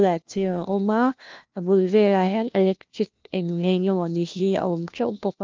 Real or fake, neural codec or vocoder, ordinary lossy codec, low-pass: fake; codec, 16 kHz, 1 kbps, FunCodec, trained on LibriTTS, 50 frames a second; Opus, 24 kbps; 7.2 kHz